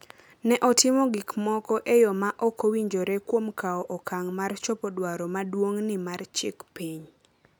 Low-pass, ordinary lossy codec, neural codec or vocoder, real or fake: none; none; none; real